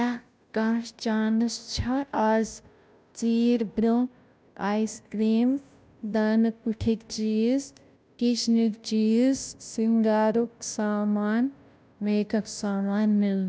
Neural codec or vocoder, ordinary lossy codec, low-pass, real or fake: codec, 16 kHz, 0.5 kbps, FunCodec, trained on Chinese and English, 25 frames a second; none; none; fake